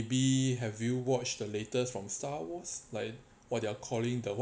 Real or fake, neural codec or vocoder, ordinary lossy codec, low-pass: real; none; none; none